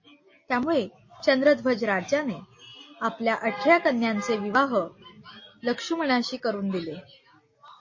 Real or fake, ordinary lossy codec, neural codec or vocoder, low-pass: real; MP3, 32 kbps; none; 7.2 kHz